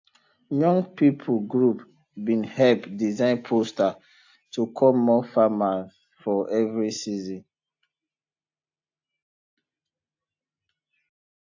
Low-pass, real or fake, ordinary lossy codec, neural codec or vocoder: 7.2 kHz; real; AAC, 48 kbps; none